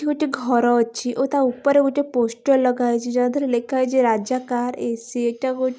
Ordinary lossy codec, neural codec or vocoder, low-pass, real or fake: none; none; none; real